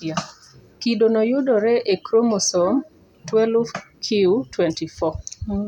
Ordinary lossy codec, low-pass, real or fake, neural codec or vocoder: none; 19.8 kHz; real; none